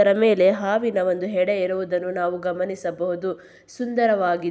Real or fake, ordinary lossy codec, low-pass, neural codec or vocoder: real; none; none; none